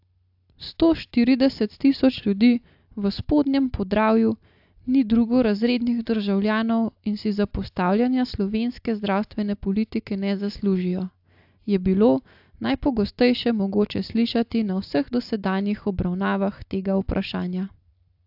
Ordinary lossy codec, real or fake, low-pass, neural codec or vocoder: AAC, 48 kbps; real; 5.4 kHz; none